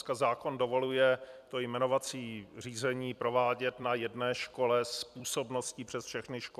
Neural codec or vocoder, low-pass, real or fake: vocoder, 44.1 kHz, 128 mel bands every 256 samples, BigVGAN v2; 14.4 kHz; fake